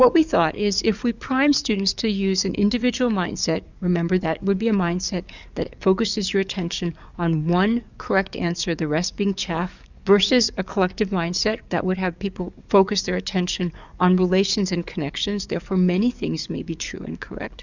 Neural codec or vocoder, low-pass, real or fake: codec, 44.1 kHz, 7.8 kbps, DAC; 7.2 kHz; fake